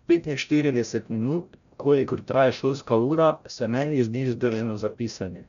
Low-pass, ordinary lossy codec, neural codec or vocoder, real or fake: 7.2 kHz; none; codec, 16 kHz, 0.5 kbps, FreqCodec, larger model; fake